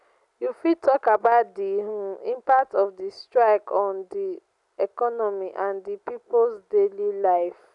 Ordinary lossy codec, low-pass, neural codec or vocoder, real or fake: none; 10.8 kHz; none; real